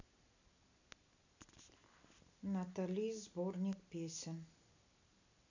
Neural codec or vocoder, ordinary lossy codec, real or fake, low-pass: none; none; real; 7.2 kHz